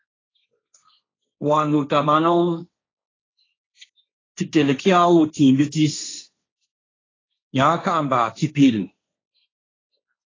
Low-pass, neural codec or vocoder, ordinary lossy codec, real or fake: 7.2 kHz; codec, 16 kHz, 1.1 kbps, Voila-Tokenizer; AAC, 32 kbps; fake